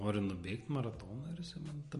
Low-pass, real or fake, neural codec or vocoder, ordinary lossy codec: 14.4 kHz; real; none; MP3, 48 kbps